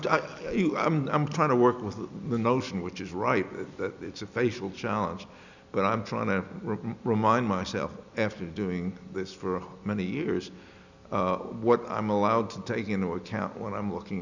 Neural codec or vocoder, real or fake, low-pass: none; real; 7.2 kHz